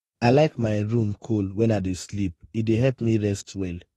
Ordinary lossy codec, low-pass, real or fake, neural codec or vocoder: AAC, 32 kbps; 19.8 kHz; fake; autoencoder, 48 kHz, 32 numbers a frame, DAC-VAE, trained on Japanese speech